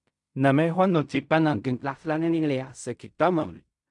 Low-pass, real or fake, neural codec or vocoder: 10.8 kHz; fake; codec, 16 kHz in and 24 kHz out, 0.4 kbps, LongCat-Audio-Codec, fine tuned four codebook decoder